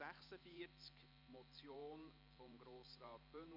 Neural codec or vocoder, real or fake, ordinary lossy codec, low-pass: vocoder, 44.1 kHz, 128 mel bands every 512 samples, BigVGAN v2; fake; MP3, 48 kbps; 5.4 kHz